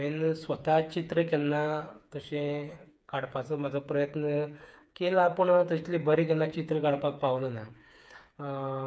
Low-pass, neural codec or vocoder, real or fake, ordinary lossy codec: none; codec, 16 kHz, 4 kbps, FreqCodec, smaller model; fake; none